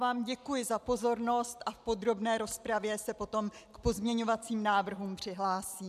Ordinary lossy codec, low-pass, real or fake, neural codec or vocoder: MP3, 96 kbps; 14.4 kHz; real; none